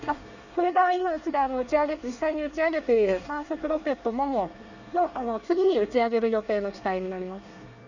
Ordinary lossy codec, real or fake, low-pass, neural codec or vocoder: none; fake; 7.2 kHz; codec, 24 kHz, 1 kbps, SNAC